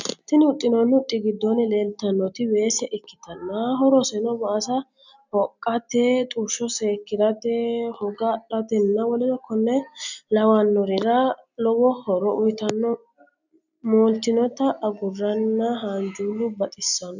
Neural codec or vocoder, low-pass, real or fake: none; 7.2 kHz; real